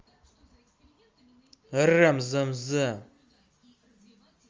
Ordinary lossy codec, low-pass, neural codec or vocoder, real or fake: Opus, 32 kbps; 7.2 kHz; none; real